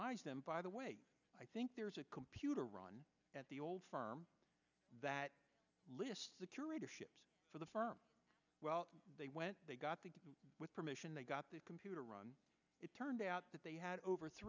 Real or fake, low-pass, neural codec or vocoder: real; 7.2 kHz; none